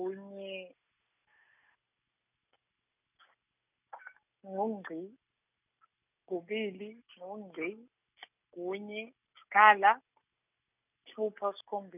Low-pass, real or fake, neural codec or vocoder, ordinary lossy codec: 3.6 kHz; real; none; none